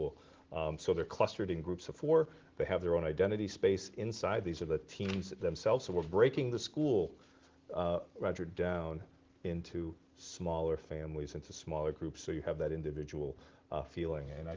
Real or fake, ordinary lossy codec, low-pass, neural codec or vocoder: real; Opus, 16 kbps; 7.2 kHz; none